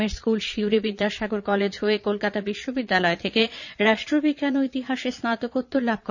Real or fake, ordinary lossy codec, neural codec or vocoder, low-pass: fake; none; vocoder, 22.05 kHz, 80 mel bands, Vocos; 7.2 kHz